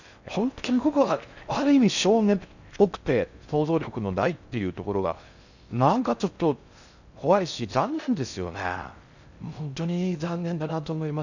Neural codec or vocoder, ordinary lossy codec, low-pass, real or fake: codec, 16 kHz in and 24 kHz out, 0.6 kbps, FocalCodec, streaming, 4096 codes; none; 7.2 kHz; fake